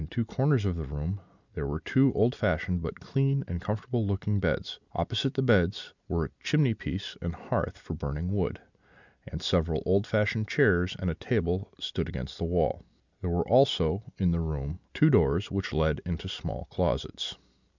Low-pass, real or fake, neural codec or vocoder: 7.2 kHz; real; none